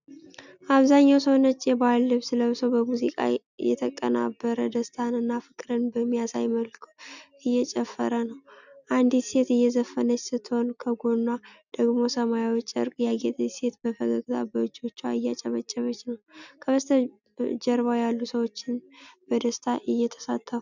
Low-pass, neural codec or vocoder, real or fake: 7.2 kHz; none; real